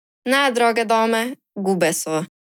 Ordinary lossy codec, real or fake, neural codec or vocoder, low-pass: none; real; none; 19.8 kHz